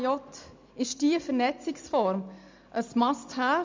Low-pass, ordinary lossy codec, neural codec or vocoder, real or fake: 7.2 kHz; MP3, 64 kbps; none; real